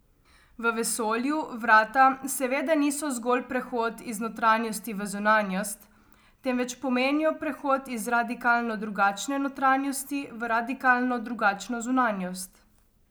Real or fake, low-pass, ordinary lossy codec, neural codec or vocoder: real; none; none; none